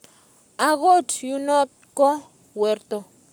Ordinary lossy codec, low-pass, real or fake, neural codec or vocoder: none; none; fake; codec, 44.1 kHz, 7.8 kbps, Pupu-Codec